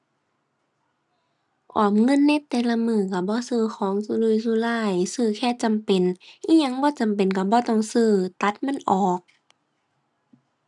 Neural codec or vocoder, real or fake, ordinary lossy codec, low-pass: none; real; none; none